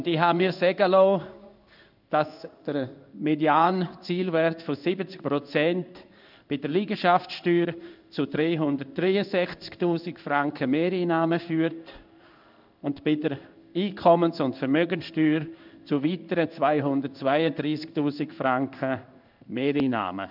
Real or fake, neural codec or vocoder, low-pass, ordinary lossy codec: fake; codec, 16 kHz in and 24 kHz out, 1 kbps, XY-Tokenizer; 5.4 kHz; none